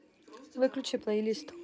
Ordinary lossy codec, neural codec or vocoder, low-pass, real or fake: none; none; none; real